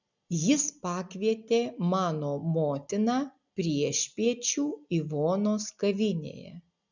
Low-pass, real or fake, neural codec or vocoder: 7.2 kHz; real; none